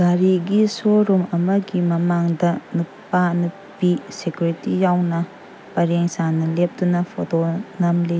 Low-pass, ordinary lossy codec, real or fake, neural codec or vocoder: none; none; real; none